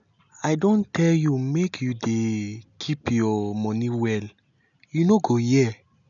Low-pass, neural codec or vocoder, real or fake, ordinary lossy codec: 7.2 kHz; none; real; none